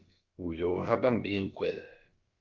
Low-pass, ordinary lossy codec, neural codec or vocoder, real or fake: 7.2 kHz; Opus, 24 kbps; codec, 16 kHz, about 1 kbps, DyCAST, with the encoder's durations; fake